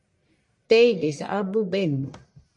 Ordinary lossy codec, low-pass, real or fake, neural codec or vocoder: MP3, 48 kbps; 10.8 kHz; fake; codec, 44.1 kHz, 1.7 kbps, Pupu-Codec